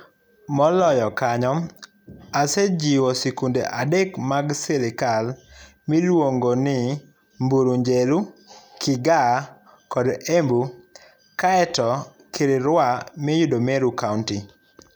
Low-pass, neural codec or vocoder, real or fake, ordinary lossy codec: none; none; real; none